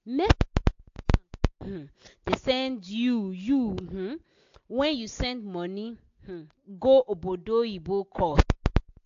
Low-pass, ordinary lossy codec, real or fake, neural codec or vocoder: 7.2 kHz; AAC, 48 kbps; real; none